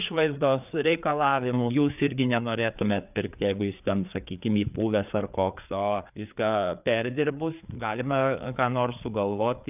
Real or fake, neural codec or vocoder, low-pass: fake; codec, 16 kHz, 4 kbps, FreqCodec, larger model; 3.6 kHz